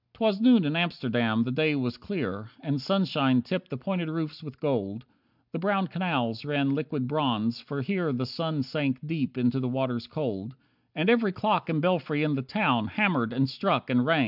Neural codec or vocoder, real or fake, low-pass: none; real; 5.4 kHz